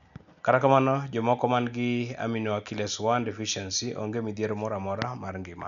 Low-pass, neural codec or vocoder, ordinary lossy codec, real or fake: 7.2 kHz; none; none; real